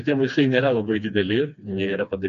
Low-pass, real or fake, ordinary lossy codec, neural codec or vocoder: 7.2 kHz; fake; AAC, 96 kbps; codec, 16 kHz, 2 kbps, FreqCodec, smaller model